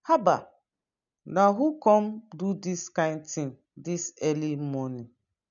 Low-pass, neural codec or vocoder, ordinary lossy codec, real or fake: 7.2 kHz; none; MP3, 96 kbps; real